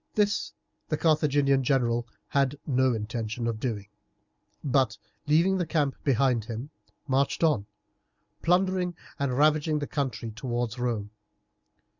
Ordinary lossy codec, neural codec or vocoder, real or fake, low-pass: Opus, 64 kbps; none; real; 7.2 kHz